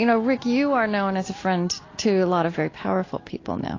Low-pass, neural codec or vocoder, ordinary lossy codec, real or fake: 7.2 kHz; none; AAC, 32 kbps; real